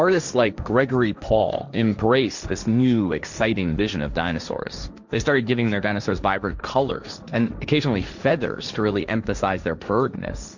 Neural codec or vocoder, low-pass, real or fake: codec, 16 kHz, 1.1 kbps, Voila-Tokenizer; 7.2 kHz; fake